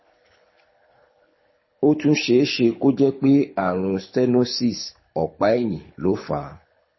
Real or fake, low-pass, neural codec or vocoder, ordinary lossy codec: fake; 7.2 kHz; codec, 24 kHz, 6 kbps, HILCodec; MP3, 24 kbps